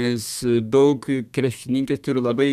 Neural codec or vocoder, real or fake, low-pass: codec, 32 kHz, 1.9 kbps, SNAC; fake; 14.4 kHz